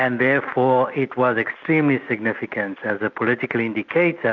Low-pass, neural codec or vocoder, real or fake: 7.2 kHz; none; real